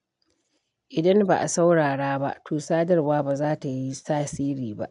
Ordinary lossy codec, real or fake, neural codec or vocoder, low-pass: none; real; none; 10.8 kHz